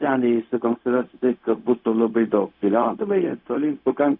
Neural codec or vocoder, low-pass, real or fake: codec, 16 kHz, 0.4 kbps, LongCat-Audio-Codec; 5.4 kHz; fake